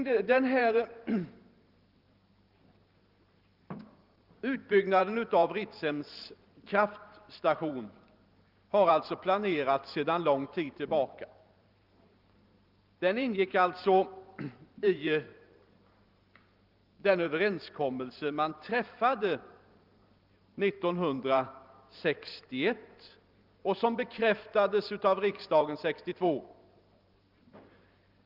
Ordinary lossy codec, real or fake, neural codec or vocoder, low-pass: Opus, 24 kbps; fake; vocoder, 44.1 kHz, 128 mel bands every 512 samples, BigVGAN v2; 5.4 kHz